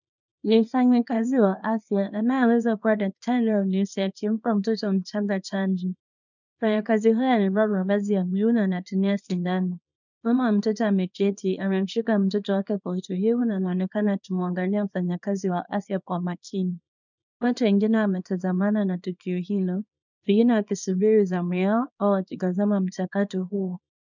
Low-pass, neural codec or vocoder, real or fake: 7.2 kHz; codec, 24 kHz, 0.9 kbps, WavTokenizer, small release; fake